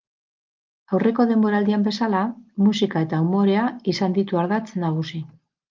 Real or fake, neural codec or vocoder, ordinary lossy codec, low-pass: real; none; Opus, 32 kbps; 7.2 kHz